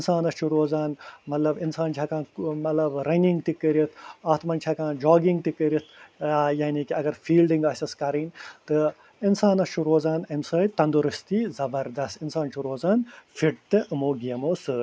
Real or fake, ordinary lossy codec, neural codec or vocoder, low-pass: real; none; none; none